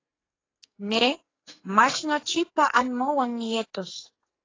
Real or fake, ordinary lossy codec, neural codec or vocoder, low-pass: fake; AAC, 32 kbps; codec, 44.1 kHz, 2.6 kbps, SNAC; 7.2 kHz